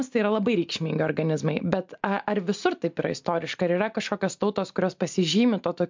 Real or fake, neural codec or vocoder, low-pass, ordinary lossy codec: real; none; 7.2 kHz; MP3, 64 kbps